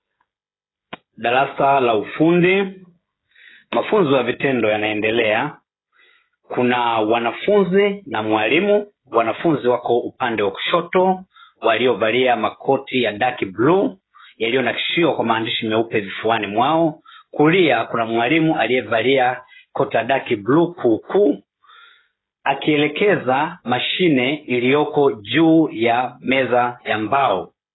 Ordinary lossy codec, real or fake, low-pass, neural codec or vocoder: AAC, 16 kbps; fake; 7.2 kHz; codec, 16 kHz, 16 kbps, FreqCodec, smaller model